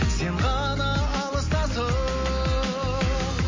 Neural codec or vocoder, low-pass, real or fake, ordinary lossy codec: none; 7.2 kHz; real; MP3, 32 kbps